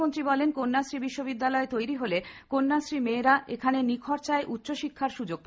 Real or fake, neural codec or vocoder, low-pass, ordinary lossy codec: real; none; none; none